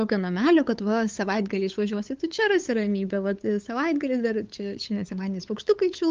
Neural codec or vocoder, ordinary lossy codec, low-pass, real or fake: codec, 16 kHz, 8 kbps, FunCodec, trained on LibriTTS, 25 frames a second; Opus, 32 kbps; 7.2 kHz; fake